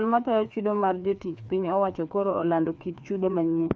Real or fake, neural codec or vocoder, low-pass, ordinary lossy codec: fake; codec, 16 kHz, 2 kbps, FreqCodec, larger model; none; none